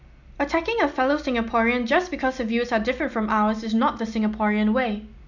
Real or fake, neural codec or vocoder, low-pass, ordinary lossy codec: real; none; 7.2 kHz; none